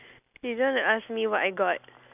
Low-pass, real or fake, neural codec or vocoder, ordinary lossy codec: 3.6 kHz; real; none; none